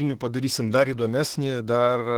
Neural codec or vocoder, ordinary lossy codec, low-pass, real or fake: autoencoder, 48 kHz, 32 numbers a frame, DAC-VAE, trained on Japanese speech; Opus, 16 kbps; 19.8 kHz; fake